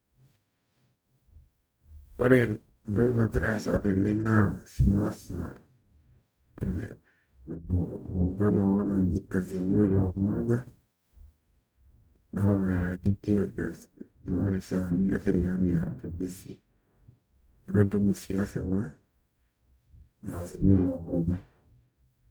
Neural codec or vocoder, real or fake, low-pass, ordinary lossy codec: codec, 44.1 kHz, 0.9 kbps, DAC; fake; none; none